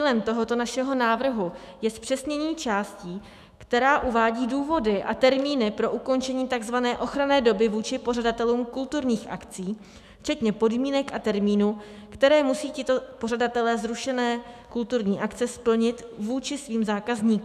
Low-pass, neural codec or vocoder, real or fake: 14.4 kHz; autoencoder, 48 kHz, 128 numbers a frame, DAC-VAE, trained on Japanese speech; fake